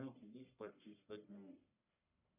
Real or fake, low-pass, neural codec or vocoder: fake; 3.6 kHz; codec, 44.1 kHz, 1.7 kbps, Pupu-Codec